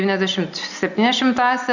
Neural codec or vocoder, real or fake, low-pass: none; real; 7.2 kHz